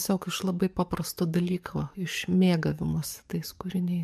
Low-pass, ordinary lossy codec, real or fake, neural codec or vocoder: 14.4 kHz; MP3, 96 kbps; fake; codec, 44.1 kHz, 7.8 kbps, DAC